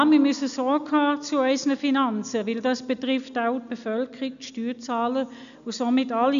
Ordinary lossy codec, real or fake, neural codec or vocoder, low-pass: none; real; none; 7.2 kHz